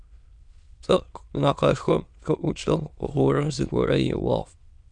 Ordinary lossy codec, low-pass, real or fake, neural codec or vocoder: Opus, 64 kbps; 9.9 kHz; fake; autoencoder, 22.05 kHz, a latent of 192 numbers a frame, VITS, trained on many speakers